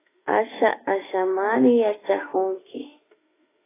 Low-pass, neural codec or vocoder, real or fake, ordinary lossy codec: 3.6 kHz; autoencoder, 48 kHz, 32 numbers a frame, DAC-VAE, trained on Japanese speech; fake; AAC, 16 kbps